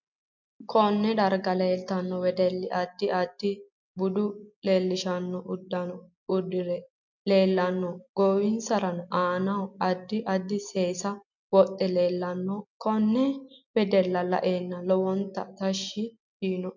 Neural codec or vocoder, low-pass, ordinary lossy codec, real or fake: none; 7.2 kHz; MP3, 64 kbps; real